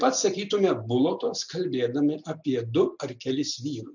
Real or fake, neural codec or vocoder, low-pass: real; none; 7.2 kHz